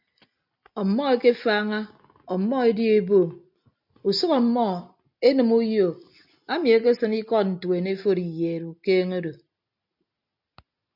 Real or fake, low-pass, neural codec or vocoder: real; 5.4 kHz; none